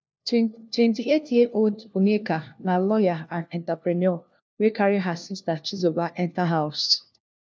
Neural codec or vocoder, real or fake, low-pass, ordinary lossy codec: codec, 16 kHz, 1 kbps, FunCodec, trained on LibriTTS, 50 frames a second; fake; none; none